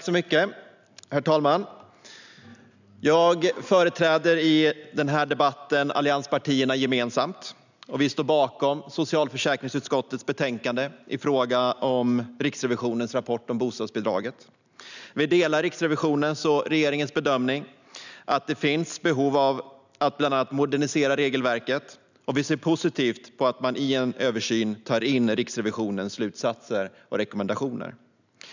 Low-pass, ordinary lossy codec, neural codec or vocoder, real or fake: 7.2 kHz; none; none; real